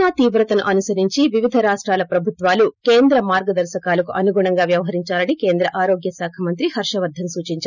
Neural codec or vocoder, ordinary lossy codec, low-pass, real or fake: none; none; 7.2 kHz; real